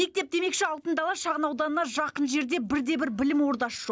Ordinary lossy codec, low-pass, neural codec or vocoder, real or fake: none; none; none; real